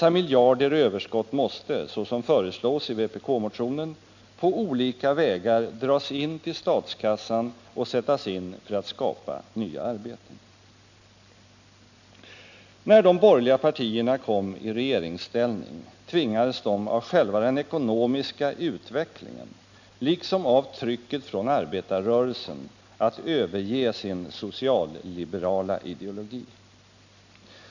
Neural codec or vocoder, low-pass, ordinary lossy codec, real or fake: none; 7.2 kHz; none; real